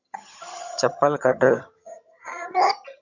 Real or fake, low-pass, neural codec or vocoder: fake; 7.2 kHz; vocoder, 22.05 kHz, 80 mel bands, HiFi-GAN